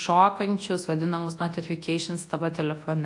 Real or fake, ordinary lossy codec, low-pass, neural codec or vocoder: fake; AAC, 48 kbps; 10.8 kHz; codec, 24 kHz, 0.9 kbps, WavTokenizer, large speech release